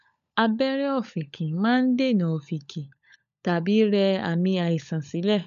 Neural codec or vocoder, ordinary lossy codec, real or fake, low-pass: codec, 16 kHz, 16 kbps, FunCodec, trained on LibriTTS, 50 frames a second; none; fake; 7.2 kHz